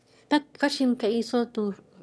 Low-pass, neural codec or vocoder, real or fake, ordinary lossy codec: none; autoencoder, 22.05 kHz, a latent of 192 numbers a frame, VITS, trained on one speaker; fake; none